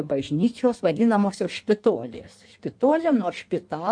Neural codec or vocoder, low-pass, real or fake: codec, 16 kHz in and 24 kHz out, 1.1 kbps, FireRedTTS-2 codec; 9.9 kHz; fake